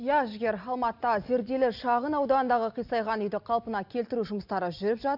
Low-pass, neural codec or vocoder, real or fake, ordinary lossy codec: 5.4 kHz; none; real; none